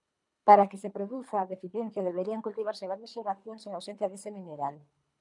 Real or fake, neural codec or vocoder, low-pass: fake; codec, 24 kHz, 3 kbps, HILCodec; 10.8 kHz